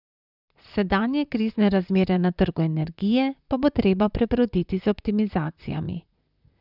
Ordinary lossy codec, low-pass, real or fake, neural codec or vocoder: none; 5.4 kHz; fake; vocoder, 44.1 kHz, 128 mel bands, Pupu-Vocoder